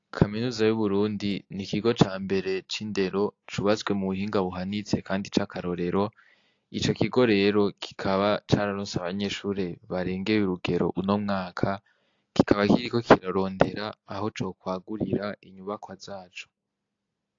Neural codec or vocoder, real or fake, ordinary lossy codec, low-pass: none; real; AAC, 48 kbps; 7.2 kHz